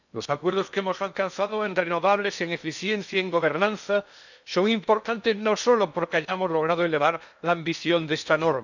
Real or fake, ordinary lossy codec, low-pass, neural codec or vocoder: fake; none; 7.2 kHz; codec, 16 kHz in and 24 kHz out, 0.8 kbps, FocalCodec, streaming, 65536 codes